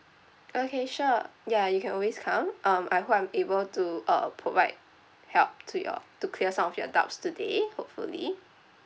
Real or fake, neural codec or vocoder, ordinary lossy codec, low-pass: real; none; none; none